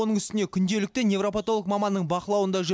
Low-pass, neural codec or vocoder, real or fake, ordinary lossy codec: none; none; real; none